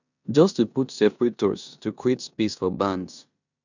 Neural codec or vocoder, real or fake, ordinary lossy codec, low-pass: codec, 16 kHz in and 24 kHz out, 0.9 kbps, LongCat-Audio-Codec, four codebook decoder; fake; none; 7.2 kHz